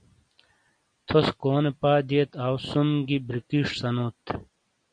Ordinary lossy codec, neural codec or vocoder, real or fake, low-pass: MP3, 64 kbps; none; real; 9.9 kHz